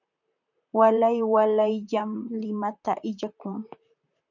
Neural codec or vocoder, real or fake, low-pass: vocoder, 44.1 kHz, 128 mel bands, Pupu-Vocoder; fake; 7.2 kHz